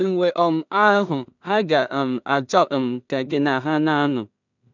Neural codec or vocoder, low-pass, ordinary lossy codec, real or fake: codec, 16 kHz in and 24 kHz out, 0.4 kbps, LongCat-Audio-Codec, two codebook decoder; 7.2 kHz; none; fake